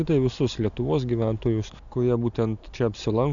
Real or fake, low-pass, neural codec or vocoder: real; 7.2 kHz; none